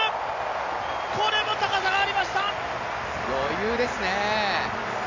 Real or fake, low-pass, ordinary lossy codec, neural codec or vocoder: real; 7.2 kHz; MP3, 64 kbps; none